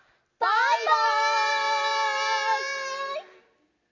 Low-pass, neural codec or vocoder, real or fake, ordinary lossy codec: 7.2 kHz; none; real; none